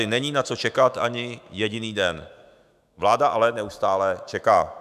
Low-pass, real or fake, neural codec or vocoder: 14.4 kHz; fake; autoencoder, 48 kHz, 128 numbers a frame, DAC-VAE, trained on Japanese speech